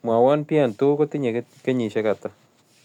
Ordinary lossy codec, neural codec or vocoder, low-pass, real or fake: none; none; 19.8 kHz; real